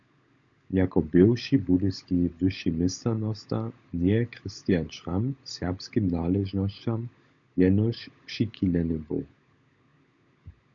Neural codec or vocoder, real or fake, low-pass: codec, 16 kHz, 16 kbps, FunCodec, trained on LibriTTS, 50 frames a second; fake; 7.2 kHz